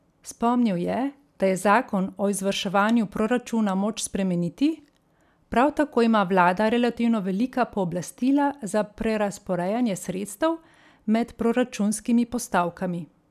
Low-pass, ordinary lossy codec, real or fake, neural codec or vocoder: 14.4 kHz; none; real; none